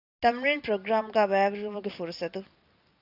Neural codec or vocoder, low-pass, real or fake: none; 5.4 kHz; real